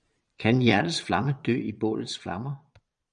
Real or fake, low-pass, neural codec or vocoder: fake; 9.9 kHz; vocoder, 22.05 kHz, 80 mel bands, Vocos